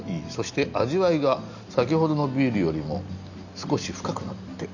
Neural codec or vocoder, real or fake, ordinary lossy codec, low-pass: none; real; none; 7.2 kHz